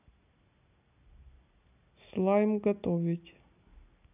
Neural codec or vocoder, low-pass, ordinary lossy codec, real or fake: none; 3.6 kHz; none; real